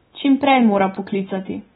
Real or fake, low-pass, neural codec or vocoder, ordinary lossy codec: real; 19.8 kHz; none; AAC, 16 kbps